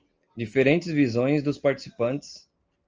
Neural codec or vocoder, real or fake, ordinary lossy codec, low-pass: none; real; Opus, 24 kbps; 7.2 kHz